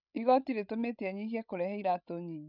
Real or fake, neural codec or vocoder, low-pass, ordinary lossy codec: fake; codec, 16 kHz, 16 kbps, FreqCodec, larger model; 5.4 kHz; none